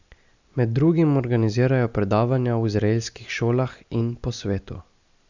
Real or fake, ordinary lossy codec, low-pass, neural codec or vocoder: real; Opus, 64 kbps; 7.2 kHz; none